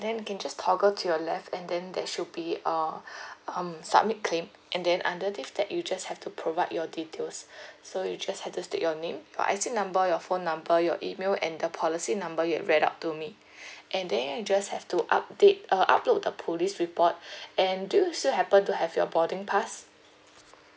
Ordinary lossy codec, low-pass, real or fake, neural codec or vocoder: none; none; real; none